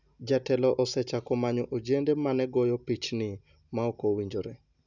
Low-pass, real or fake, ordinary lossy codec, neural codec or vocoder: 7.2 kHz; real; none; none